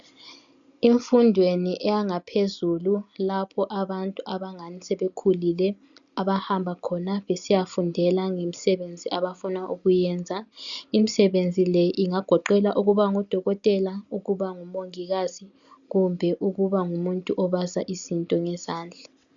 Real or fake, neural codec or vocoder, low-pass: real; none; 7.2 kHz